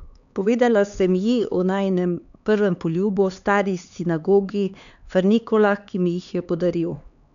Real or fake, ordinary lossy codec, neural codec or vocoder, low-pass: fake; none; codec, 16 kHz, 4 kbps, X-Codec, HuBERT features, trained on LibriSpeech; 7.2 kHz